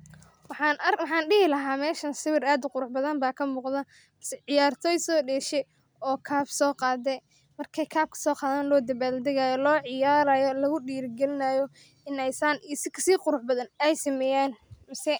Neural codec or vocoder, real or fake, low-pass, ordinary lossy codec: none; real; none; none